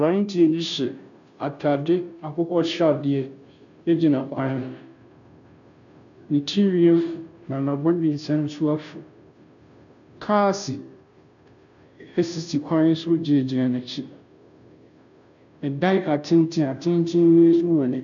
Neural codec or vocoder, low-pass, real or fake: codec, 16 kHz, 0.5 kbps, FunCodec, trained on Chinese and English, 25 frames a second; 7.2 kHz; fake